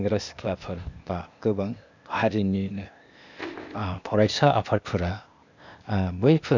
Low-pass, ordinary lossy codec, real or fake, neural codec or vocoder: 7.2 kHz; none; fake; codec, 16 kHz, 0.8 kbps, ZipCodec